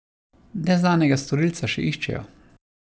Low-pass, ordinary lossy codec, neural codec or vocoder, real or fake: none; none; none; real